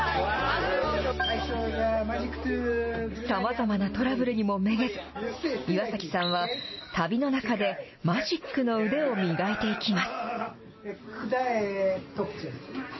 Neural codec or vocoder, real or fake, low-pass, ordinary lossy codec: none; real; 7.2 kHz; MP3, 24 kbps